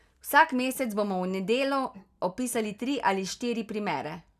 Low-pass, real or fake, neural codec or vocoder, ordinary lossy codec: 14.4 kHz; real; none; none